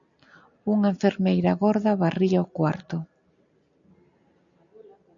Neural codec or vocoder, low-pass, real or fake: none; 7.2 kHz; real